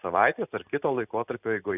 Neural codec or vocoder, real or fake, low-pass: none; real; 3.6 kHz